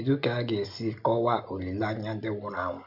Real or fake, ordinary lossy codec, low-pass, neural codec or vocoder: real; none; 5.4 kHz; none